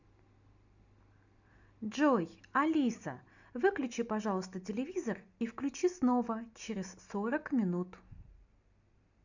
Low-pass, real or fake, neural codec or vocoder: 7.2 kHz; real; none